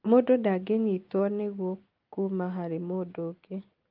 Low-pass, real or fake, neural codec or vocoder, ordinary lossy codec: 5.4 kHz; real; none; Opus, 24 kbps